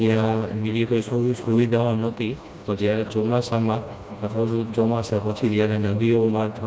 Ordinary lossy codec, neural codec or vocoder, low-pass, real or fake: none; codec, 16 kHz, 1 kbps, FreqCodec, smaller model; none; fake